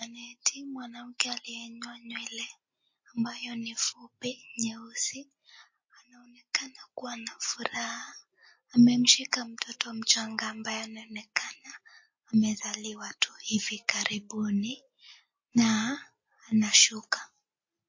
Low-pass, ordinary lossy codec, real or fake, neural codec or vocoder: 7.2 kHz; MP3, 32 kbps; real; none